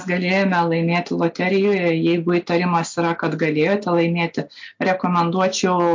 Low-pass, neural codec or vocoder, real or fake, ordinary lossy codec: 7.2 kHz; none; real; MP3, 48 kbps